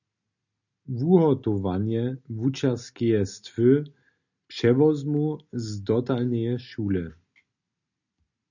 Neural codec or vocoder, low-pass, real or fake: none; 7.2 kHz; real